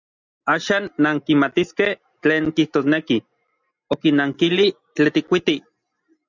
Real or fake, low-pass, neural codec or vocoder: real; 7.2 kHz; none